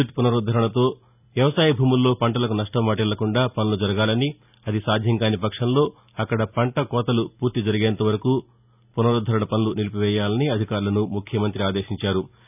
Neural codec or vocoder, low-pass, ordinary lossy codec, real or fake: none; 3.6 kHz; none; real